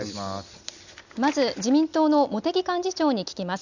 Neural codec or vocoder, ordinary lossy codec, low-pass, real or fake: none; none; 7.2 kHz; real